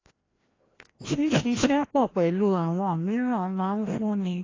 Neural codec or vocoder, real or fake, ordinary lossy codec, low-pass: codec, 16 kHz, 1 kbps, FreqCodec, larger model; fake; AAC, 32 kbps; 7.2 kHz